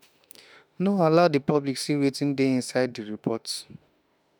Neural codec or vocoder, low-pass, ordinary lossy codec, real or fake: autoencoder, 48 kHz, 32 numbers a frame, DAC-VAE, trained on Japanese speech; none; none; fake